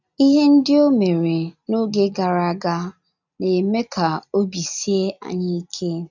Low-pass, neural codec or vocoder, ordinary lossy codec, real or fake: 7.2 kHz; none; none; real